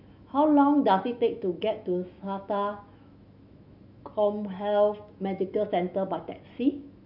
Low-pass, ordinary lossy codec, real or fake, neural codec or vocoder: 5.4 kHz; none; fake; autoencoder, 48 kHz, 128 numbers a frame, DAC-VAE, trained on Japanese speech